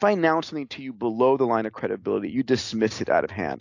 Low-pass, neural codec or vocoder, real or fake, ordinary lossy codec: 7.2 kHz; none; real; AAC, 48 kbps